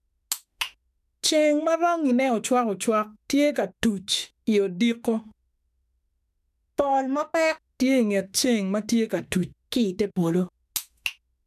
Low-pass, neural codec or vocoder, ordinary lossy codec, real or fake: 14.4 kHz; autoencoder, 48 kHz, 32 numbers a frame, DAC-VAE, trained on Japanese speech; none; fake